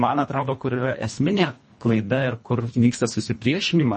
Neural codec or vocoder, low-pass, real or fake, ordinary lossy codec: codec, 24 kHz, 1.5 kbps, HILCodec; 10.8 kHz; fake; MP3, 32 kbps